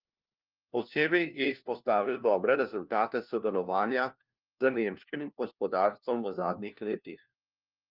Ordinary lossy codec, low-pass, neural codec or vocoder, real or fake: Opus, 24 kbps; 5.4 kHz; codec, 16 kHz, 1 kbps, FunCodec, trained on LibriTTS, 50 frames a second; fake